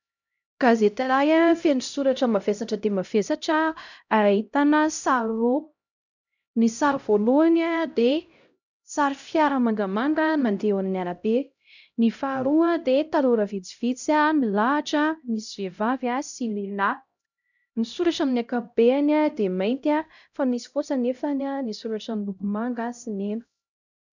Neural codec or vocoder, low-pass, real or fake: codec, 16 kHz, 0.5 kbps, X-Codec, HuBERT features, trained on LibriSpeech; 7.2 kHz; fake